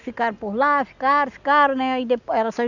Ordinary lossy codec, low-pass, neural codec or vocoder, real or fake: none; 7.2 kHz; none; real